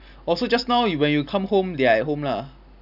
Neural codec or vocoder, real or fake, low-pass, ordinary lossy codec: none; real; 5.4 kHz; none